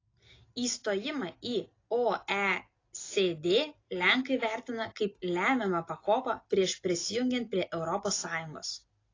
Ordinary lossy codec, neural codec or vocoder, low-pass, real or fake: AAC, 32 kbps; none; 7.2 kHz; real